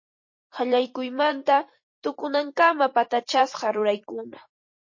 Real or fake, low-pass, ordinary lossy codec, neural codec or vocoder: fake; 7.2 kHz; MP3, 32 kbps; vocoder, 44.1 kHz, 128 mel bands every 256 samples, BigVGAN v2